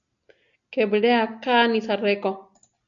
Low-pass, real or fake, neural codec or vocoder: 7.2 kHz; real; none